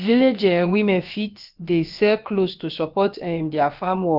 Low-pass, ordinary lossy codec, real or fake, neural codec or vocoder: 5.4 kHz; Opus, 32 kbps; fake; codec, 16 kHz, about 1 kbps, DyCAST, with the encoder's durations